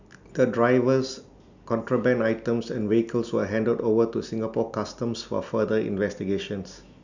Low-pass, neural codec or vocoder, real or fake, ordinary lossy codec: 7.2 kHz; none; real; none